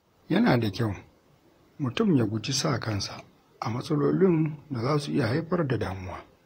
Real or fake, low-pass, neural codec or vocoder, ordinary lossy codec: fake; 19.8 kHz; vocoder, 44.1 kHz, 128 mel bands, Pupu-Vocoder; AAC, 48 kbps